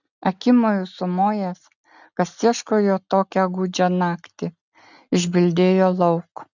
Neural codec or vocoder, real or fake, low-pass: none; real; 7.2 kHz